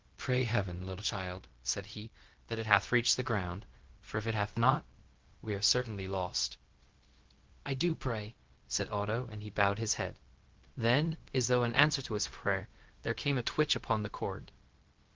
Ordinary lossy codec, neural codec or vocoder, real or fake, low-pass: Opus, 16 kbps; codec, 16 kHz, 0.4 kbps, LongCat-Audio-Codec; fake; 7.2 kHz